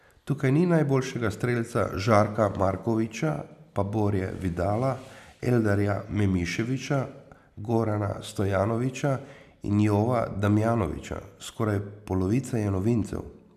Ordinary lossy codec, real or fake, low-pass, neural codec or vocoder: none; real; 14.4 kHz; none